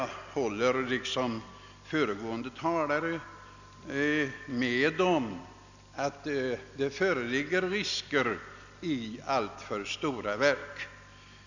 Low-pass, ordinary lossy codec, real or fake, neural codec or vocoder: 7.2 kHz; none; real; none